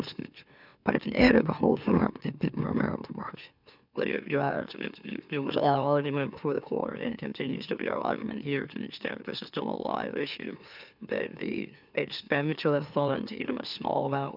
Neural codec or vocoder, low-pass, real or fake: autoencoder, 44.1 kHz, a latent of 192 numbers a frame, MeloTTS; 5.4 kHz; fake